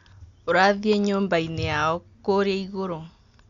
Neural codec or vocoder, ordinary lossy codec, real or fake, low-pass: none; Opus, 64 kbps; real; 7.2 kHz